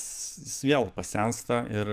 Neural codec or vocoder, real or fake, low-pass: codec, 44.1 kHz, 7.8 kbps, DAC; fake; 14.4 kHz